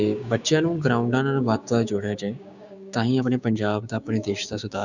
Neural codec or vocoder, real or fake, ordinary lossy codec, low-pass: codec, 16 kHz, 6 kbps, DAC; fake; none; 7.2 kHz